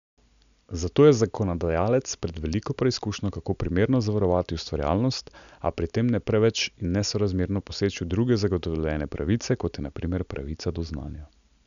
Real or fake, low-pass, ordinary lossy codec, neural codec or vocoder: real; 7.2 kHz; none; none